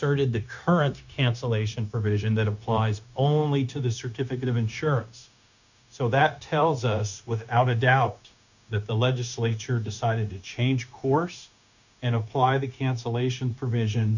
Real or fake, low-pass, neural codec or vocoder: fake; 7.2 kHz; codec, 16 kHz, 0.9 kbps, LongCat-Audio-Codec